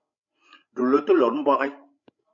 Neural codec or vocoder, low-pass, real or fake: codec, 16 kHz, 16 kbps, FreqCodec, larger model; 7.2 kHz; fake